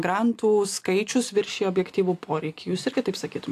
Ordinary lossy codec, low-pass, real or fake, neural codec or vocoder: AAC, 64 kbps; 14.4 kHz; real; none